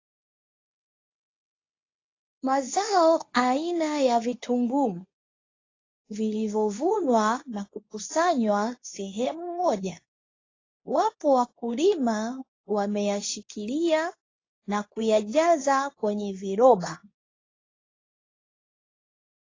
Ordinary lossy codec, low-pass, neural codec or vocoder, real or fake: AAC, 32 kbps; 7.2 kHz; codec, 24 kHz, 0.9 kbps, WavTokenizer, medium speech release version 2; fake